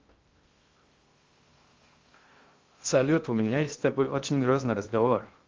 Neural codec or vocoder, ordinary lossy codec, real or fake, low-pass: codec, 16 kHz in and 24 kHz out, 0.6 kbps, FocalCodec, streaming, 2048 codes; Opus, 32 kbps; fake; 7.2 kHz